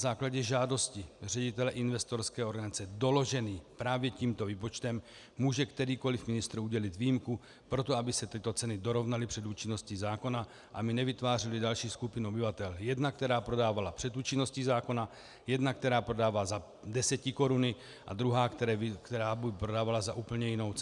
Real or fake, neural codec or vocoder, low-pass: real; none; 10.8 kHz